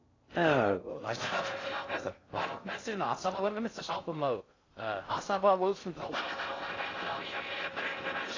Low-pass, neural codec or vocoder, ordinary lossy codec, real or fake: 7.2 kHz; codec, 16 kHz in and 24 kHz out, 0.6 kbps, FocalCodec, streaming, 2048 codes; AAC, 32 kbps; fake